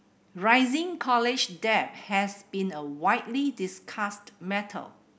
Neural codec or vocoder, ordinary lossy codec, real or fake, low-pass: none; none; real; none